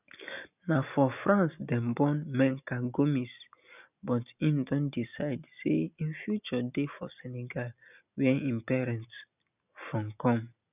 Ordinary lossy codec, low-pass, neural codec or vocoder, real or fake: none; 3.6 kHz; none; real